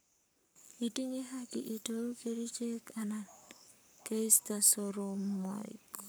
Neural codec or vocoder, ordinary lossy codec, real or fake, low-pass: codec, 44.1 kHz, 7.8 kbps, Pupu-Codec; none; fake; none